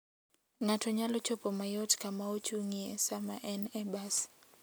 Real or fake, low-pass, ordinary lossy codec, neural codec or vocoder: real; none; none; none